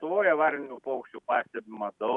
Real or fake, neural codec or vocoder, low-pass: real; none; 10.8 kHz